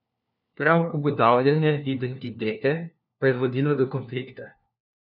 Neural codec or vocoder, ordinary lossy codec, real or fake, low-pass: codec, 16 kHz, 1 kbps, FunCodec, trained on LibriTTS, 50 frames a second; none; fake; 5.4 kHz